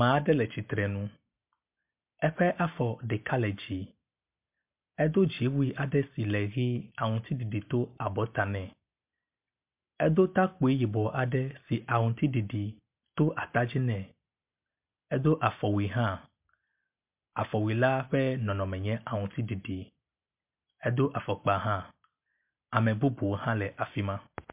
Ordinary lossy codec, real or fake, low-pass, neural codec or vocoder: MP3, 32 kbps; real; 3.6 kHz; none